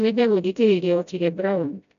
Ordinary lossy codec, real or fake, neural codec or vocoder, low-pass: none; fake; codec, 16 kHz, 0.5 kbps, FreqCodec, smaller model; 7.2 kHz